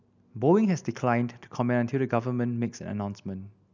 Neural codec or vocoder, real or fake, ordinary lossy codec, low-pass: none; real; none; 7.2 kHz